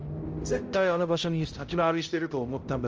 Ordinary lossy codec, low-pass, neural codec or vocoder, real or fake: Opus, 24 kbps; 7.2 kHz; codec, 16 kHz, 0.5 kbps, X-Codec, HuBERT features, trained on balanced general audio; fake